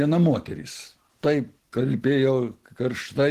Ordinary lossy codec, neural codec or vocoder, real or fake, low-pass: Opus, 16 kbps; none; real; 14.4 kHz